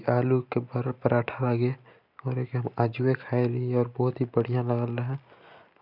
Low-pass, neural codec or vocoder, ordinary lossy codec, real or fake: 5.4 kHz; none; none; real